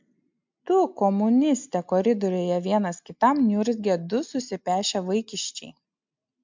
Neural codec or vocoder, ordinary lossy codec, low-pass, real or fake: none; MP3, 64 kbps; 7.2 kHz; real